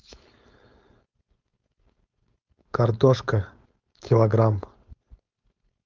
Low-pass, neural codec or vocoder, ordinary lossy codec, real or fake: 7.2 kHz; codec, 16 kHz, 4.8 kbps, FACodec; Opus, 32 kbps; fake